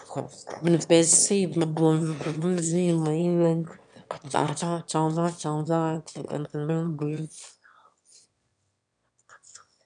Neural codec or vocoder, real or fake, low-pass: autoencoder, 22.05 kHz, a latent of 192 numbers a frame, VITS, trained on one speaker; fake; 9.9 kHz